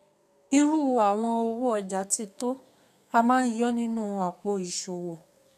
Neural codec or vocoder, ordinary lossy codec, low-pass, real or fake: codec, 32 kHz, 1.9 kbps, SNAC; none; 14.4 kHz; fake